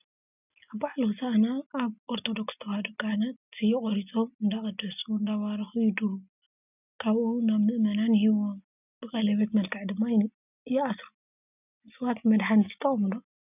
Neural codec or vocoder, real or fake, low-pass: none; real; 3.6 kHz